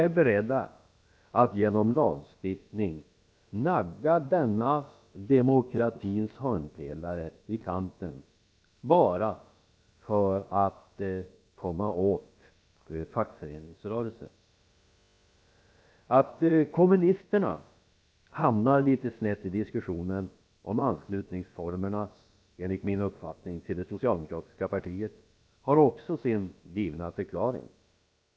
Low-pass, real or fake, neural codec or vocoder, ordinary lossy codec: none; fake; codec, 16 kHz, about 1 kbps, DyCAST, with the encoder's durations; none